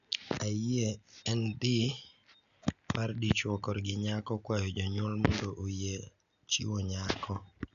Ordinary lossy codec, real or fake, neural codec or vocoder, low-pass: none; real; none; 7.2 kHz